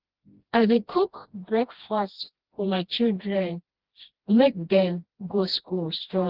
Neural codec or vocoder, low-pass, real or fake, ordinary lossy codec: codec, 16 kHz, 1 kbps, FreqCodec, smaller model; 5.4 kHz; fake; Opus, 16 kbps